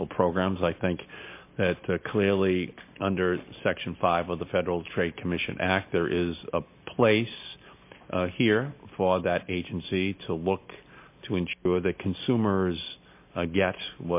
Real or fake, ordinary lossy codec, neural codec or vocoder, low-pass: real; MP3, 24 kbps; none; 3.6 kHz